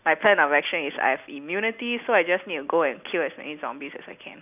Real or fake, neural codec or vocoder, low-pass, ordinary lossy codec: real; none; 3.6 kHz; none